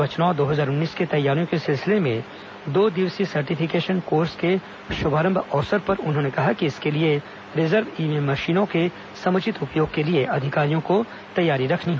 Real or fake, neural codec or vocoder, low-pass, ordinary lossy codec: real; none; none; none